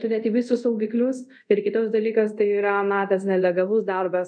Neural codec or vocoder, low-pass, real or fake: codec, 24 kHz, 0.5 kbps, DualCodec; 9.9 kHz; fake